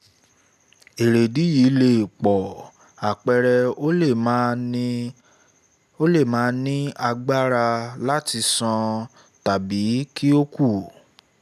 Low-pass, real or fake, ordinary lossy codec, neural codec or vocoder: 14.4 kHz; real; none; none